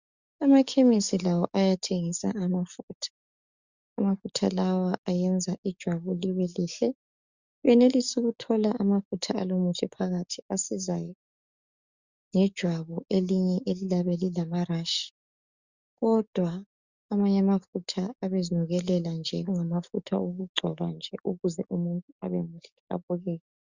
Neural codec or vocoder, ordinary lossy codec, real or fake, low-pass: codec, 44.1 kHz, 7.8 kbps, DAC; Opus, 64 kbps; fake; 7.2 kHz